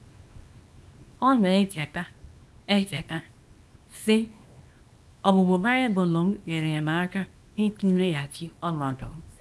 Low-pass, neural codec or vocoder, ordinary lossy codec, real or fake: none; codec, 24 kHz, 0.9 kbps, WavTokenizer, small release; none; fake